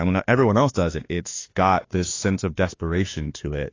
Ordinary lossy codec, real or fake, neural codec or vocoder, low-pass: AAC, 32 kbps; fake; autoencoder, 48 kHz, 32 numbers a frame, DAC-VAE, trained on Japanese speech; 7.2 kHz